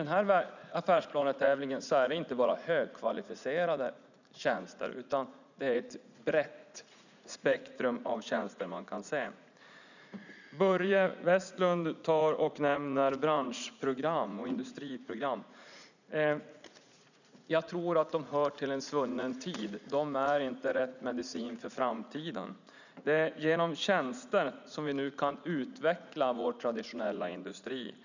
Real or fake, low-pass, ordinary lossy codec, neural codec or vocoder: fake; 7.2 kHz; none; vocoder, 44.1 kHz, 80 mel bands, Vocos